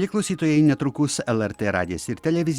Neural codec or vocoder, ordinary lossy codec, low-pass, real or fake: none; Opus, 64 kbps; 19.8 kHz; real